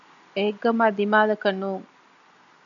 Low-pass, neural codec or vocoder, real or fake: 7.2 kHz; none; real